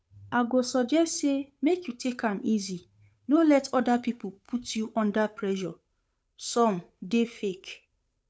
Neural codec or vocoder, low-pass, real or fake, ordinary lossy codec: codec, 16 kHz, 8 kbps, FunCodec, trained on Chinese and English, 25 frames a second; none; fake; none